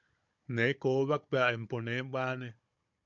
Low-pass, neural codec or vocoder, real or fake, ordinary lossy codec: 7.2 kHz; codec, 16 kHz, 16 kbps, FunCodec, trained on Chinese and English, 50 frames a second; fake; MP3, 64 kbps